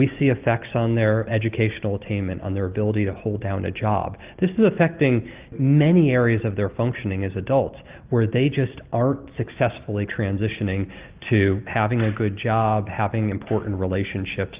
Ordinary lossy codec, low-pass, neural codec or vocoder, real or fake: Opus, 24 kbps; 3.6 kHz; none; real